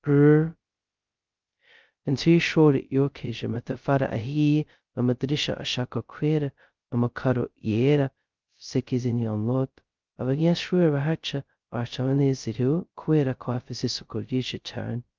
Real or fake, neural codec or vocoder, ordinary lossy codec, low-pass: fake; codec, 16 kHz, 0.2 kbps, FocalCodec; Opus, 24 kbps; 7.2 kHz